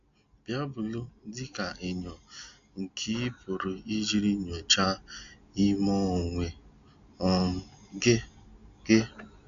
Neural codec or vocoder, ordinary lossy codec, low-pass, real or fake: none; MP3, 64 kbps; 7.2 kHz; real